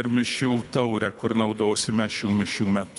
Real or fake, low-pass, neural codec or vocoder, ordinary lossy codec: fake; 10.8 kHz; codec, 24 kHz, 3 kbps, HILCodec; MP3, 96 kbps